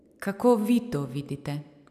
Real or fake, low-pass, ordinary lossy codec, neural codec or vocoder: real; 14.4 kHz; none; none